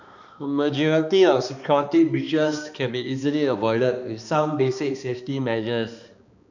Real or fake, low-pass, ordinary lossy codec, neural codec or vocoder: fake; 7.2 kHz; none; codec, 16 kHz, 2 kbps, X-Codec, HuBERT features, trained on balanced general audio